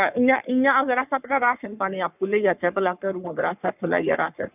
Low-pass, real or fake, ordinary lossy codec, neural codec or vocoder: 3.6 kHz; fake; none; codec, 44.1 kHz, 3.4 kbps, Pupu-Codec